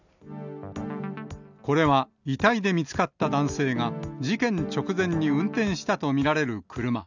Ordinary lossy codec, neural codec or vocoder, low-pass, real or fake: none; none; 7.2 kHz; real